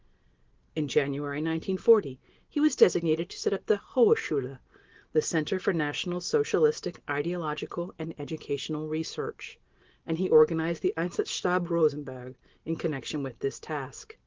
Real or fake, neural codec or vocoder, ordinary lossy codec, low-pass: real; none; Opus, 16 kbps; 7.2 kHz